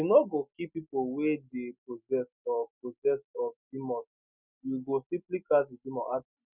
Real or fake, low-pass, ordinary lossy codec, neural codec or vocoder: real; 3.6 kHz; none; none